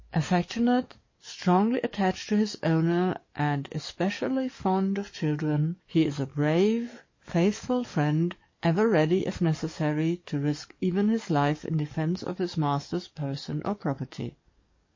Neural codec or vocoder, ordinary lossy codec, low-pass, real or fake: codec, 44.1 kHz, 7.8 kbps, DAC; MP3, 32 kbps; 7.2 kHz; fake